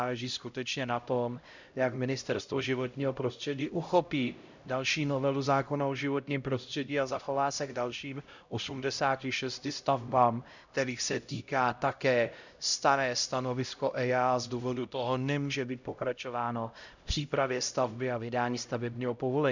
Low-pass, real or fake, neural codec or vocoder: 7.2 kHz; fake; codec, 16 kHz, 0.5 kbps, X-Codec, HuBERT features, trained on LibriSpeech